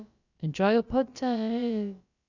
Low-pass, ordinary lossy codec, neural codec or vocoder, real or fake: 7.2 kHz; none; codec, 16 kHz, about 1 kbps, DyCAST, with the encoder's durations; fake